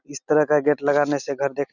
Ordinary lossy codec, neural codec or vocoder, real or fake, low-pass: none; none; real; 7.2 kHz